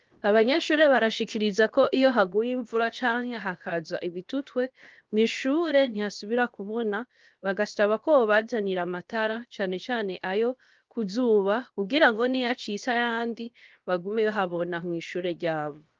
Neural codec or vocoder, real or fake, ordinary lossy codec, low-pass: codec, 16 kHz, 0.7 kbps, FocalCodec; fake; Opus, 32 kbps; 7.2 kHz